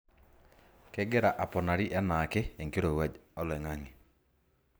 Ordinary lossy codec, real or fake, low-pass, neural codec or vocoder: none; real; none; none